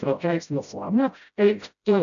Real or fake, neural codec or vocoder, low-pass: fake; codec, 16 kHz, 0.5 kbps, FreqCodec, smaller model; 7.2 kHz